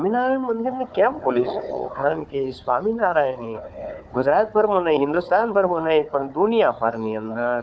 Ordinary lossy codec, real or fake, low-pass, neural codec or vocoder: none; fake; none; codec, 16 kHz, 4 kbps, FunCodec, trained on Chinese and English, 50 frames a second